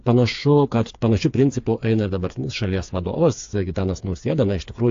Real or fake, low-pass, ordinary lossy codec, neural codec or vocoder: fake; 7.2 kHz; AAC, 48 kbps; codec, 16 kHz, 4 kbps, FreqCodec, smaller model